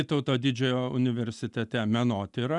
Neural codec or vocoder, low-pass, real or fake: none; 10.8 kHz; real